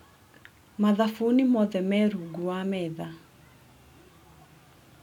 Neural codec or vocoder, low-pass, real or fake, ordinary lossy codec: none; 19.8 kHz; real; none